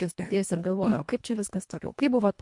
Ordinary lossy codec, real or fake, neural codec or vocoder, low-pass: MP3, 64 kbps; fake; codec, 24 kHz, 1.5 kbps, HILCodec; 10.8 kHz